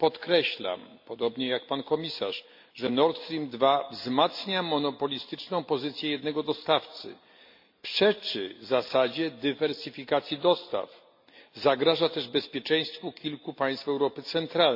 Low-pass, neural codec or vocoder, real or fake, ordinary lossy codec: 5.4 kHz; none; real; MP3, 48 kbps